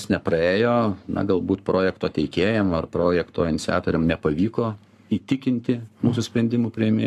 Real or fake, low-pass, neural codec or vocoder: fake; 14.4 kHz; codec, 44.1 kHz, 7.8 kbps, Pupu-Codec